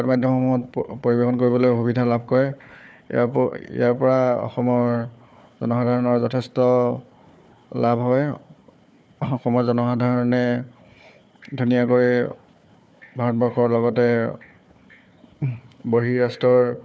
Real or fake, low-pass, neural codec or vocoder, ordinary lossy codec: fake; none; codec, 16 kHz, 4 kbps, FunCodec, trained on Chinese and English, 50 frames a second; none